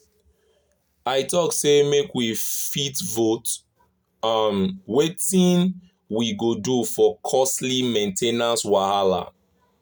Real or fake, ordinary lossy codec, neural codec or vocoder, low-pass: real; none; none; none